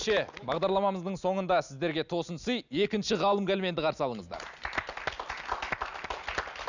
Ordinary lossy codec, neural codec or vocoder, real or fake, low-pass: none; none; real; 7.2 kHz